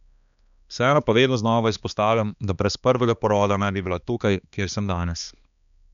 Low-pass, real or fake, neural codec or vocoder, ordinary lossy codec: 7.2 kHz; fake; codec, 16 kHz, 2 kbps, X-Codec, HuBERT features, trained on balanced general audio; none